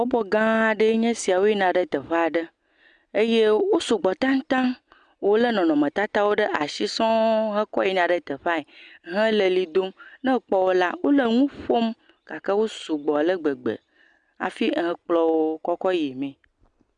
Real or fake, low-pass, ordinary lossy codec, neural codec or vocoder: real; 9.9 kHz; Opus, 64 kbps; none